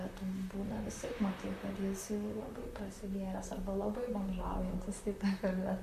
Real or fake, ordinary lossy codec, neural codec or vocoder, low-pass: fake; MP3, 96 kbps; vocoder, 44.1 kHz, 128 mel bands, Pupu-Vocoder; 14.4 kHz